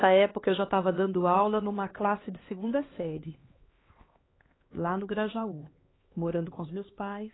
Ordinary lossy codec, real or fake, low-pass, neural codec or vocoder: AAC, 16 kbps; fake; 7.2 kHz; codec, 16 kHz, 2 kbps, X-Codec, HuBERT features, trained on LibriSpeech